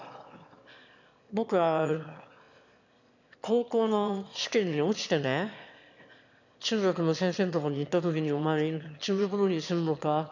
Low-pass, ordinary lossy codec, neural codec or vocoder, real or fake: 7.2 kHz; none; autoencoder, 22.05 kHz, a latent of 192 numbers a frame, VITS, trained on one speaker; fake